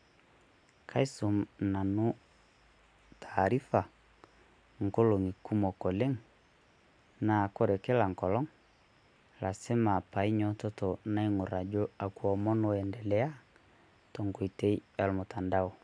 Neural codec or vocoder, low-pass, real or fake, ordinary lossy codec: none; 9.9 kHz; real; none